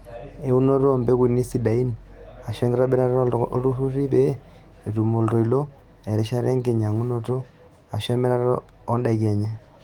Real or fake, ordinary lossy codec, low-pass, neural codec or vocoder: fake; Opus, 24 kbps; 19.8 kHz; autoencoder, 48 kHz, 128 numbers a frame, DAC-VAE, trained on Japanese speech